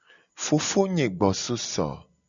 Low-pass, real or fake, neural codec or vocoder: 7.2 kHz; real; none